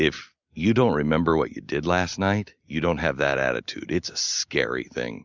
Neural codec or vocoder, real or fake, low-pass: none; real; 7.2 kHz